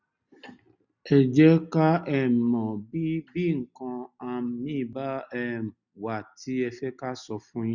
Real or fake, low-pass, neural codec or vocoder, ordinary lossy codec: real; 7.2 kHz; none; none